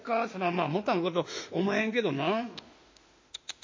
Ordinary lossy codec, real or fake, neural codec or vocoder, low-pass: MP3, 32 kbps; fake; autoencoder, 48 kHz, 32 numbers a frame, DAC-VAE, trained on Japanese speech; 7.2 kHz